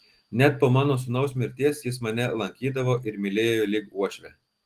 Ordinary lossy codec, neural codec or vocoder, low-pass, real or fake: Opus, 24 kbps; none; 14.4 kHz; real